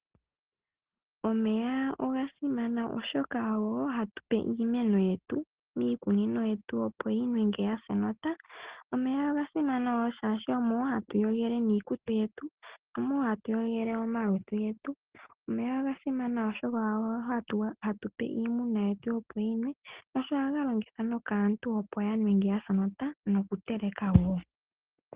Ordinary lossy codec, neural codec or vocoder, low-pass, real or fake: Opus, 16 kbps; none; 3.6 kHz; real